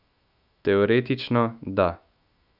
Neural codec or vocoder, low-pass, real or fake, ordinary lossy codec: autoencoder, 48 kHz, 128 numbers a frame, DAC-VAE, trained on Japanese speech; 5.4 kHz; fake; none